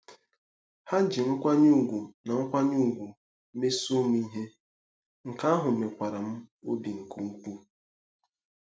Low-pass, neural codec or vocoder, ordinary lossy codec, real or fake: none; none; none; real